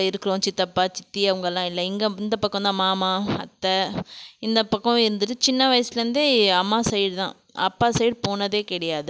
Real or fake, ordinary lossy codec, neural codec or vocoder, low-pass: real; none; none; none